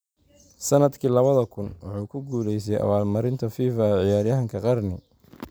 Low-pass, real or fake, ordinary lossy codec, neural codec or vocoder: none; real; none; none